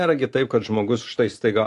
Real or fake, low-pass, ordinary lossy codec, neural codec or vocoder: real; 10.8 kHz; AAC, 48 kbps; none